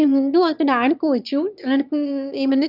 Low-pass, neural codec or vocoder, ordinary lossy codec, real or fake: 5.4 kHz; autoencoder, 22.05 kHz, a latent of 192 numbers a frame, VITS, trained on one speaker; none; fake